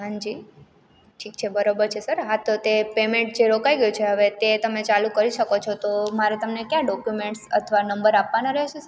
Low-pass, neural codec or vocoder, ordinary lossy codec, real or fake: none; none; none; real